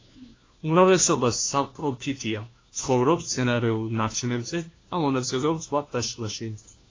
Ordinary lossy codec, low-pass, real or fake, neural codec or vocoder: AAC, 32 kbps; 7.2 kHz; fake; codec, 16 kHz, 1 kbps, FunCodec, trained on LibriTTS, 50 frames a second